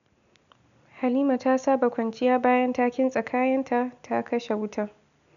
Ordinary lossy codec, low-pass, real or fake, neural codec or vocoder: none; 7.2 kHz; real; none